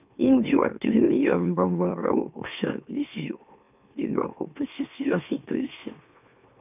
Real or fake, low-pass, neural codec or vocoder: fake; 3.6 kHz; autoencoder, 44.1 kHz, a latent of 192 numbers a frame, MeloTTS